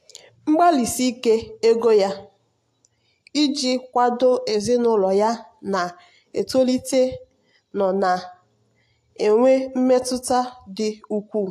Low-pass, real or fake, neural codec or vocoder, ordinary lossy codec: 14.4 kHz; real; none; AAC, 64 kbps